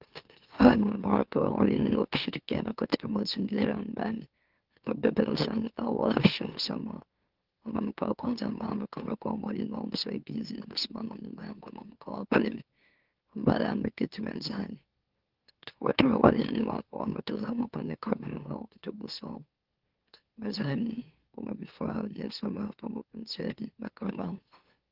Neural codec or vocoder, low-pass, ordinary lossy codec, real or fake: autoencoder, 44.1 kHz, a latent of 192 numbers a frame, MeloTTS; 5.4 kHz; Opus, 16 kbps; fake